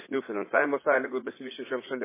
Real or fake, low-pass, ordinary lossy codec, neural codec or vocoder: fake; 3.6 kHz; MP3, 16 kbps; vocoder, 44.1 kHz, 80 mel bands, Vocos